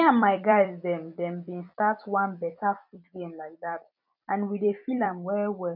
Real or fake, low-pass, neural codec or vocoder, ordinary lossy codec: fake; 5.4 kHz; vocoder, 44.1 kHz, 128 mel bands every 256 samples, BigVGAN v2; none